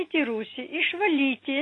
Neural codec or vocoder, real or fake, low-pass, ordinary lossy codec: none; real; 10.8 kHz; AAC, 32 kbps